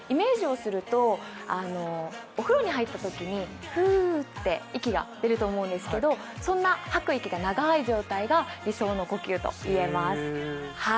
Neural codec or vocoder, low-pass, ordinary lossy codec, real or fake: none; none; none; real